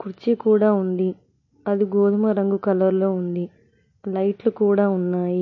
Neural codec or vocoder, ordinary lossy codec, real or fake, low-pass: none; MP3, 32 kbps; real; 7.2 kHz